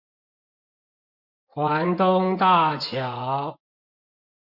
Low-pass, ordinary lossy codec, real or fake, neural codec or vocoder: 5.4 kHz; MP3, 48 kbps; fake; vocoder, 24 kHz, 100 mel bands, Vocos